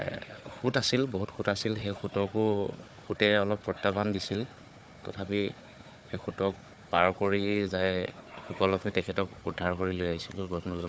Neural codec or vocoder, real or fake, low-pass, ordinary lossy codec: codec, 16 kHz, 4 kbps, FunCodec, trained on Chinese and English, 50 frames a second; fake; none; none